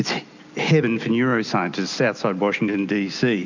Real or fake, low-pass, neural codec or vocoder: real; 7.2 kHz; none